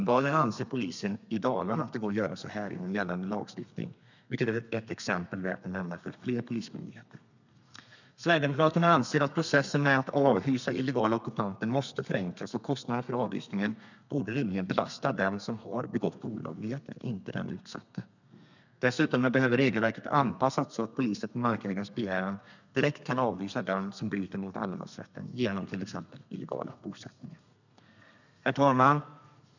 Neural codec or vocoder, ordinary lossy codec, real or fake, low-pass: codec, 32 kHz, 1.9 kbps, SNAC; none; fake; 7.2 kHz